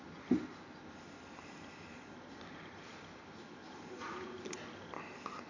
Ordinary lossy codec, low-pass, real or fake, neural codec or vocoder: AAC, 48 kbps; 7.2 kHz; real; none